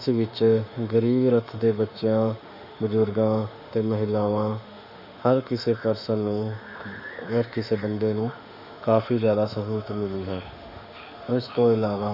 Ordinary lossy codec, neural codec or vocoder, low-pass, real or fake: none; autoencoder, 48 kHz, 32 numbers a frame, DAC-VAE, trained on Japanese speech; 5.4 kHz; fake